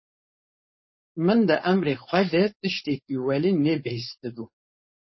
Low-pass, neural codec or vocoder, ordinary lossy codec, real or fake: 7.2 kHz; codec, 16 kHz, 4.8 kbps, FACodec; MP3, 24 kbps; fake